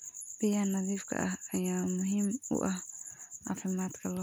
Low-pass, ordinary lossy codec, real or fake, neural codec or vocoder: none; none; real; none